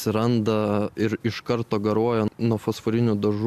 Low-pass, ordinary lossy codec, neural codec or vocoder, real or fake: 14.4 kHz; AAC, 96 kbps; none; real